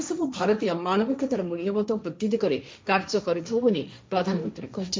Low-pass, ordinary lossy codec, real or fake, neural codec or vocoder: none; none; fake; codec, 16 kHz, 1.1 kbps, Voila-Tokenizer